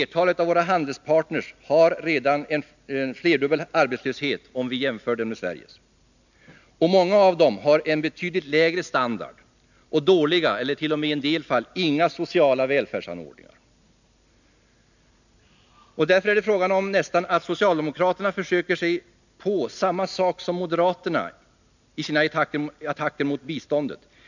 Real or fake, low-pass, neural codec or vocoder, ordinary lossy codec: real; 7.2 kHz; none; none